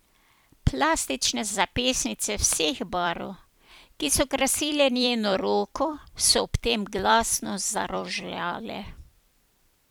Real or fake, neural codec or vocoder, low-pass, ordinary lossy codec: fake; vocoder, 44.1 kHz, 128 mel bands every 256 samples, BigVGAN v2; none; none